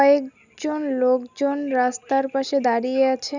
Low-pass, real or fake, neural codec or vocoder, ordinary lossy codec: 7.2 kHz; real; none; none